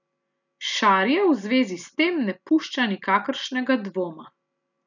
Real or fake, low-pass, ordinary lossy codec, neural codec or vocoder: real; 7.2 kHz; none; none